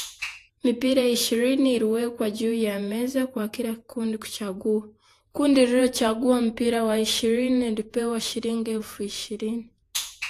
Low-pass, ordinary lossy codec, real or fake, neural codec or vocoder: 14.4 kHz; AAC, 64 kbps; fake; vocoder, 48 kHz, 128 mel bands, Vocos